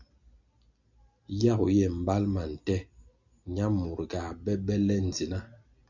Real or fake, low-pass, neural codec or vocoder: real; 7.2 kHz; none